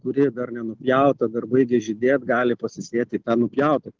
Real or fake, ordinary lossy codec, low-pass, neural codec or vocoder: real; Opus, 16 kbps; 7.2 kHz; none